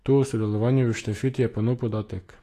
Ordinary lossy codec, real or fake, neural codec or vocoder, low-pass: AAC, 48 kbps; fake; autoencoder, 48 kHz, 128 numbers a frame, DAC-VAE, trained on Japanese speech; 14.4 kHz